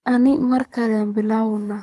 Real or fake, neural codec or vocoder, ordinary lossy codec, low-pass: fake; codec, 24 kHz, 6 kbps, HILCodec; none; none